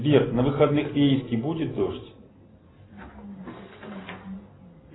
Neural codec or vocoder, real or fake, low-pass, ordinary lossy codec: none; real; 7.2 kHz; AAC, 16 kbps